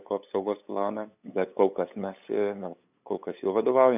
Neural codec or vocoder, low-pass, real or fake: codec, 16 kHz, 8 kbps, FunCodec, trained on LibriTTS, 25 frames a second; 3.6 kHz; fake